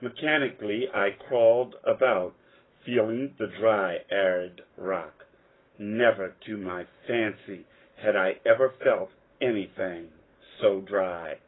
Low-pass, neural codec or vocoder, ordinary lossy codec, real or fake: 7.2 kHz; codec, 44.1 kHz, 7.8 kbps, Pupu-Codec; AAC, 16 kbps; fake